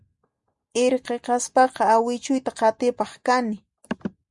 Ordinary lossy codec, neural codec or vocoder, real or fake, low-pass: Opus, 64 kbps; none; real; 10.8 kHz